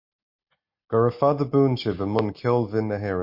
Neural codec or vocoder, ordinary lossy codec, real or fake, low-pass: none; MP3, 32 kbps; real; 5.4 kHz